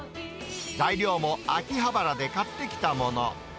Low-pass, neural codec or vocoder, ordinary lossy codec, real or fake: none; none; none; real